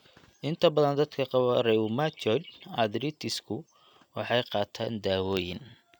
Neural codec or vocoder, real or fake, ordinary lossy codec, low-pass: none; real; none; 19.8 kHz